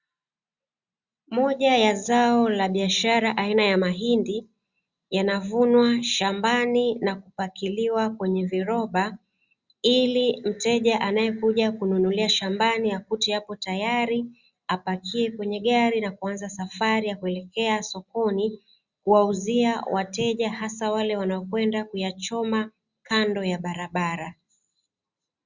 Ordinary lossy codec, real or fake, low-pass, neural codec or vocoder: Opus, 64 kbps; real; 7.2 kHz; none